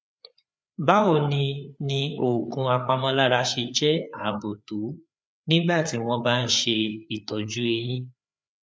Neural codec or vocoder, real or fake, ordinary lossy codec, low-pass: codec, 16 kHz, 4 kbps, FreqCodec, larger model; fake; none; none